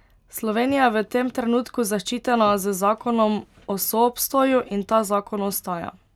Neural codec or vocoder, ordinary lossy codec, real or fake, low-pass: none; none; real; 19.8 kHz